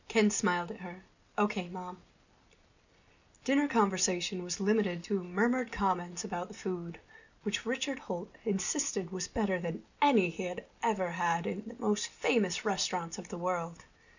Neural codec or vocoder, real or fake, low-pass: none; real; 7.2 kHz